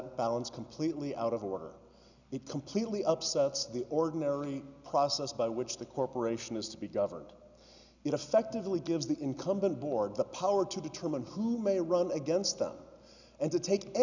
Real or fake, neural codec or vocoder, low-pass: real; none; 7.2 kHz